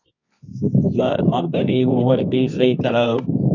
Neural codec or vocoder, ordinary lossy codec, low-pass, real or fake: codec, 24 kHz, 0.9 kbps, WavTokenizer, medium music audio release; AAC, 48 kbps; 7.2 kHz; fake